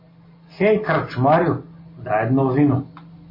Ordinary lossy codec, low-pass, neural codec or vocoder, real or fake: MP3, 24 kbps; 5.4 kHz; none; real